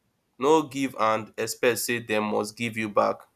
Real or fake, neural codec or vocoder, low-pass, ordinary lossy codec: real; none; 14.4 kHz; none